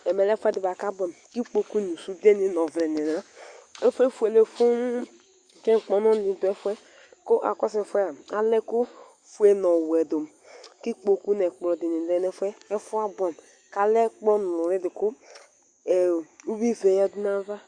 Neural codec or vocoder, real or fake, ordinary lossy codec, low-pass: autoencoder, 48 kHz, 128 numbers a frame, DAC-VAE, trained on Japanese speech; fake; Opus, 64 kbps; 9.9 kHz